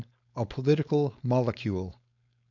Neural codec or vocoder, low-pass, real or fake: codec, 16 kHz, 4.8 kbps, FACodec; 7.2 kHz; fake